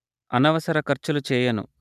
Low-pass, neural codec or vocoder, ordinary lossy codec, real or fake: 14.4 kHz; none; none; real